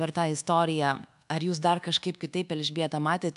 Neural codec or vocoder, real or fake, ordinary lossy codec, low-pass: codec, 24 kHz, 1.2 kbps, DualCodec; fake; AAC, 96 kbps; 10.8 kHz